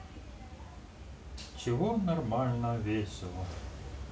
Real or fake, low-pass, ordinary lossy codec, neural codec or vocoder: real; none; none; none